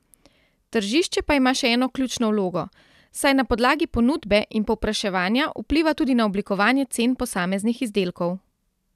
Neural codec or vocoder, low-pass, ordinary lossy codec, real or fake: none; 14.4 kHz; none; real